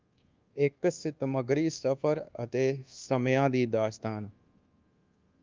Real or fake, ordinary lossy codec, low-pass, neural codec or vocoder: fake; Opus, 24 kbps; 7.2 kHz; codec, 24 kHz, 1.2 kbps, DualCodec